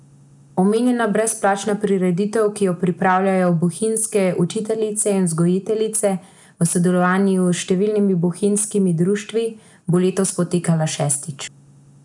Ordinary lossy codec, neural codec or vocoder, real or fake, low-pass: none; none; real; 10.8 kHz